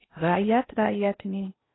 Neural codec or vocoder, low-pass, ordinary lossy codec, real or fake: codec, 16 kHz in and 24 kHz out, 0.6 kbps, FocalCodec, streaming, 4096 codes; 7.2 kHz; AAC, 16 kbps; fake